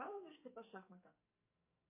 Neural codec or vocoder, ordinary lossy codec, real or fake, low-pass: codec, 44.1 kHz, 2.6 kbps, SNAC; MP3, 24 kbps; fake; 3.6 kHz